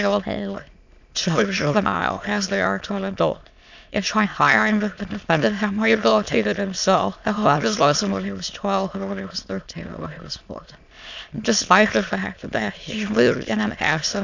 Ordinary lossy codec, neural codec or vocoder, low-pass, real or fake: Opus, 64 kbps; autoencoder, 22.05 kHz, a latent of 192 numbers a frame, VITS, trained on many speakers; 7.2 kHz; fake